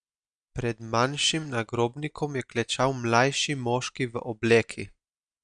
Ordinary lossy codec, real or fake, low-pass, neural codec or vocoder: Opus, 64 kbps; real; 9.9 kHz; none